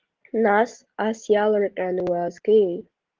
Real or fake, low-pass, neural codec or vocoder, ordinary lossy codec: real; 7.2 kHz; none; Opus, 16 kbps